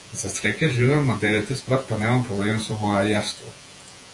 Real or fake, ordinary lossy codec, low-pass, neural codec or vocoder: fake; AAC, 48 kbps; 10.8 kHz; vocoder, 48 kHz, 128 mel bands, Vocos